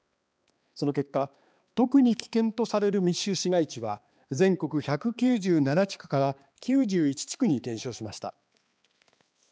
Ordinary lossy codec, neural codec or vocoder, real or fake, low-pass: none; codec, 16 kHz, 2 kbps, X-Codec, HuBERT features, trained on balanced general audio; fake; none